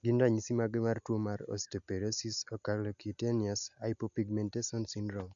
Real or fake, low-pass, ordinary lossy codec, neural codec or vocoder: real; 7.2 kHz; none; none